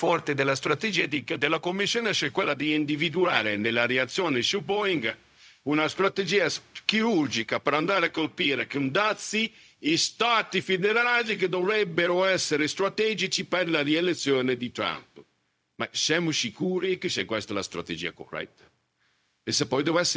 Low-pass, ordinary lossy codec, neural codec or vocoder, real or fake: none; none; codec, 16 kHz, 0.4 kbps, LongCat-Audio-Codec; fake